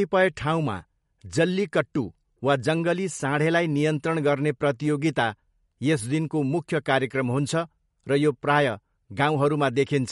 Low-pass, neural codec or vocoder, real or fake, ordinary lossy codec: 19.8 kHz; none; real; MP3, 48 kbps